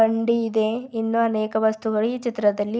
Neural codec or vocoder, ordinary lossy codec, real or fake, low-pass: none; none; real; none